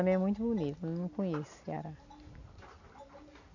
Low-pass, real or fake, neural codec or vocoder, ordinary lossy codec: 7.2 kHz; real; none; none